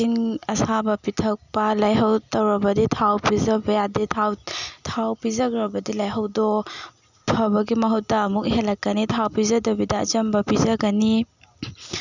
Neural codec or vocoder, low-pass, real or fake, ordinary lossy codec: none; 7.2 kHz; real; none